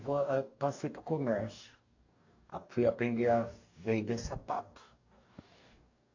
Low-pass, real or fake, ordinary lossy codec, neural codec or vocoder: 7.2 kHz; fake; AAC, 48 kbps; codec, 44.1 kHz, 2.6 kbps, DAC